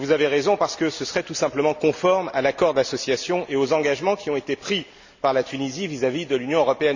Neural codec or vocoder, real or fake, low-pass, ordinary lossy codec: none; real; 7.2 kHz; none